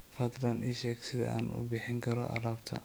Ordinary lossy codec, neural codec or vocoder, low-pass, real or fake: none; codec, 44.1 kHz, 7.8 kbps, DAC; none; fake